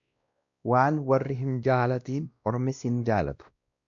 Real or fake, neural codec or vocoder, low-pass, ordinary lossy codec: fake; codec, 16 kHz, 1 kbps, X-Codec, WavLM features, trained on Multilingual LibriSpeech; 7.2 kHz; MP3, 64 kbps